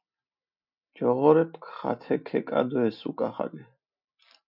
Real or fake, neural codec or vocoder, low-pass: real; none; 5.4 kHz